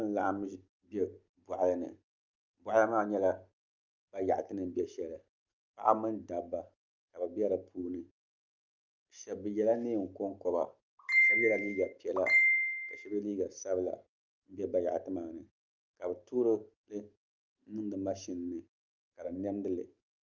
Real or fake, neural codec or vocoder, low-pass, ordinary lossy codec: real; none; 7.2 kHz; Opus, 32 kbps